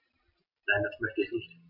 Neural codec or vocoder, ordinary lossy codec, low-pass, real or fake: none; none; 5.4 kHz; real